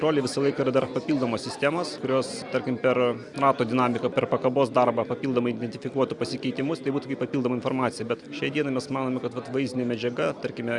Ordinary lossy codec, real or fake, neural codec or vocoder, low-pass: Opus, 64 kbps; real; none; 10.8 kHz